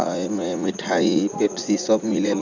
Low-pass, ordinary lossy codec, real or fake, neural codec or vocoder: 7.2 kHz; none; fake; vocoder, 44.1 kHz, 80 mel bands, Vocos